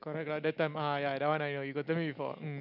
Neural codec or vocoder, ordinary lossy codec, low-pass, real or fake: none; none; 5.4 kHz; real